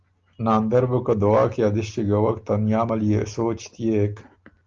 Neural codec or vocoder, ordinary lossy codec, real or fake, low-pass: none; Opus, 32 kbps; real; 7.2 kHz